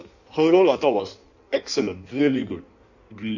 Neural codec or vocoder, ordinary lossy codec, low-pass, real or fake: codec, 16 kHz in and 24 kHz out, 1.1 kbps, FireRedTTS-2 codec; none; 7.2 kHz; fake